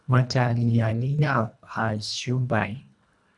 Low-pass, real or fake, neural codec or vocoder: 10.8 kHz; fake; codec, 24 kHz, 1.5 kbps, HILCodec